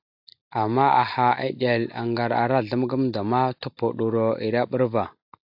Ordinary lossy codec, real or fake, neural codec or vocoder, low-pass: AAC, 48 kbps; real; none; 5.4 kHz